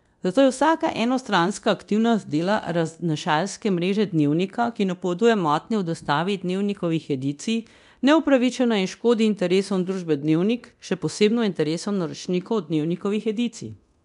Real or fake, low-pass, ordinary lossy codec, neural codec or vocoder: fake; 10.8 kHz; none; codec, 24 kHz, 0.9 kbps, DualCodec